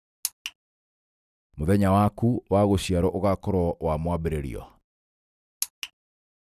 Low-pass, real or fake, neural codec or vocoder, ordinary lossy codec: 14.4 kHz; real; none; none